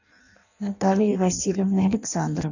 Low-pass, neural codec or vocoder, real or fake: 7.2 kHz; codec, 16 kHz in and 24 kHz out, 0.6 kbps, FireRedTTS-2 codec; fake